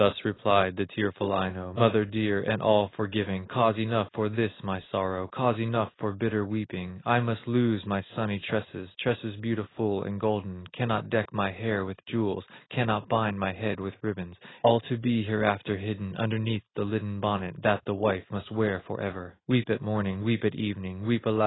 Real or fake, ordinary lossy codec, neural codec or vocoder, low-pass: real; AAC, 16 kbps; none; 7.2 kHz